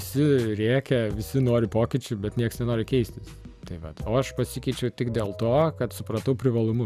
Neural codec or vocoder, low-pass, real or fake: none; 14.4 kHz; real